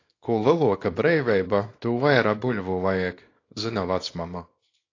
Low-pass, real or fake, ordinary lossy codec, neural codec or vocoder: 7.2 kHz; fake; AAC, 32 kbps; codec, 24 kHz, 0.9 kbps, WavTokenizer, small release